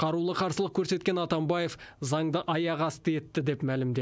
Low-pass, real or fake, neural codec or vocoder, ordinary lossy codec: none; real; none; none